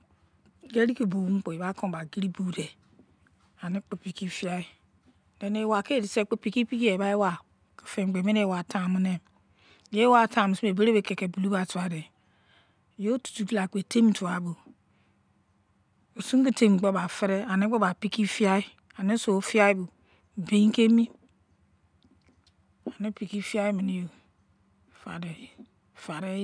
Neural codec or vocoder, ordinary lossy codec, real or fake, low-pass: none; none; real; 9.9 kHz